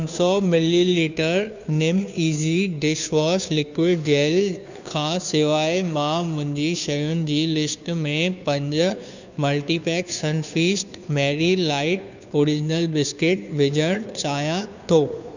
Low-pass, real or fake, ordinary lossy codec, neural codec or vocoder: 7.2 kHz; fake; none; codec, 16 kHz, 2 kbps, FunCodec, trained on Chinese and English, 25 frames a second